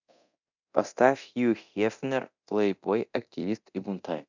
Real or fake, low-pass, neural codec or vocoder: fake; 7.2 kHz; codec, 24 kHz, 0.9 kbps, DualCodec